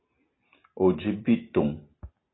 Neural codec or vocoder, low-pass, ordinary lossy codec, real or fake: none; 7.2 kHz; AAC, 16 kbps; real